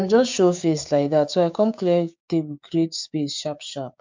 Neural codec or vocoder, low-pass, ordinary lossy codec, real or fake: codec, 16 kHz, 6 kbps, DAC; 7.2 kHz; MP3, 64 kbps; fake